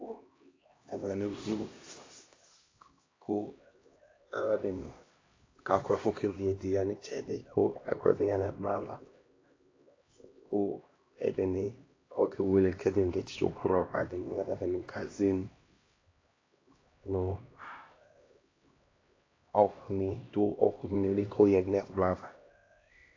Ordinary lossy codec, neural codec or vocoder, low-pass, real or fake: AAC, 32 kbps; codec, 16 kHz, 1 kbps, X-Codec, HuBERT features, trained on LibriSpeech; 7.2 kHz; fake